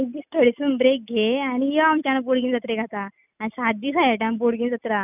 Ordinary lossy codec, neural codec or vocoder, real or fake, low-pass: none; none; real; 3.6 kHz